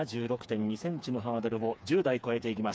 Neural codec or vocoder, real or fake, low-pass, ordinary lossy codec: codec, 16 kHz, 4 kbps, FreqCodec, smaller model; fake; none; none